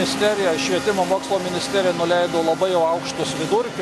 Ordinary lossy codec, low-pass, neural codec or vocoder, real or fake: MP3, 64 kbps; 14.4 kHz; none; real